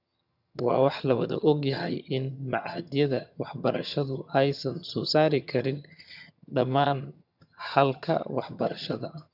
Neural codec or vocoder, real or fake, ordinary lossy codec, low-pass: vocoder, 22.05 kHz, 80 mel bands, HiFi-GAN; fake; none; 5.4 kHz